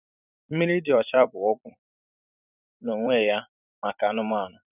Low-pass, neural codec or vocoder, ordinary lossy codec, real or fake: 3.6 kHz; vocoder, 44.1 kHz, 128 mel bands every 256 samples, BigVGAN v2; none; fake